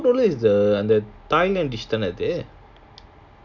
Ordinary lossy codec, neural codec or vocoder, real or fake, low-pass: none; none; real; 7.2 kHz